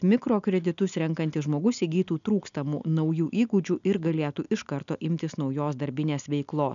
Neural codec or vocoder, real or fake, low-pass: none; real; 7.2 kHz